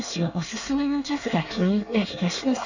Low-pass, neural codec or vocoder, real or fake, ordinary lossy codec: 7.2 kHz; codec, 24 kHz, 1 kbps, SNAC; fake; none